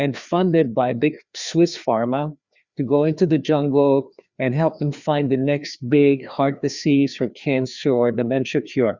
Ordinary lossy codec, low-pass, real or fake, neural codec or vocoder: Opus, 64 kbps; 7.2 kHz; fake; codec, 16 kHz, 2 kbps, FreqCodec, larger model